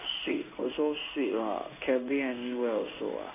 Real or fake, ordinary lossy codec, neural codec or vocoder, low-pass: fake; none; codec, 16 kHz in and 24 kHz out, 1 kbps, XY-Tokenizer; 3.6 kHz